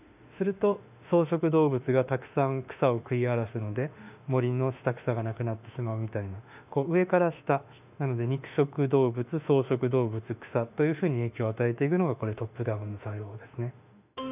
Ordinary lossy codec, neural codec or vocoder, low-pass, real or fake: none; autoencoder, 48 kHz, 32 numbers a frame, DAC-VAE, trained on Japanese speech; 3.6 kHz; fake